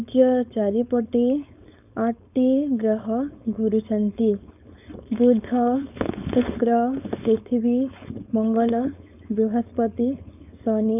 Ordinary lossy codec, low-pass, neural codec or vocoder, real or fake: none; 3.6 kHz; codec, 16 kHz, 4.8 kbps, FACodec; fake